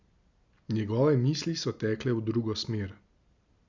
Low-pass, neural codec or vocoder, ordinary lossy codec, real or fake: 7.2 kHz; none; Opus, 64 kbps; real